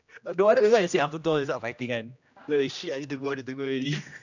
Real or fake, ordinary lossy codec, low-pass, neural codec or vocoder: fake; none; 7.2 kHz; codec, 16 kHz, 1 kbps, X-Codec, HuBERT features, trained on general audio